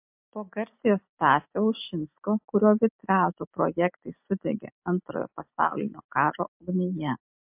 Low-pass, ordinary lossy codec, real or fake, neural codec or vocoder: 3.6 kHz; MP3, 32 kbps; real; none